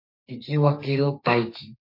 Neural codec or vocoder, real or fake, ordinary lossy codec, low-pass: codec, 32 kHz, 1.9 kbps, SNAC; fake; MP3, 32 kbps; 5.4 kHz